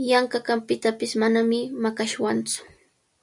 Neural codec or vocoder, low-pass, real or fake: none; 10.8 kHz; real